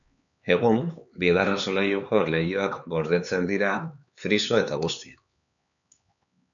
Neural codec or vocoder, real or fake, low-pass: codec, 16 kHz, 4 kbps, X-Codec, HuBERT features, trained on LibriSpeech; fake; 7.2 kHz